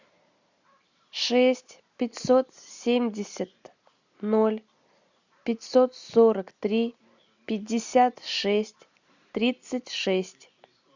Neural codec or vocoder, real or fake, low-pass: none; real; 7.2 kHz